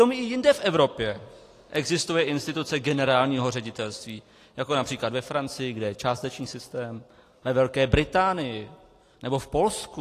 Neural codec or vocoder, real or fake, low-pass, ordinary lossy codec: none; real; 14.4 kHz; AAC, 48 kbps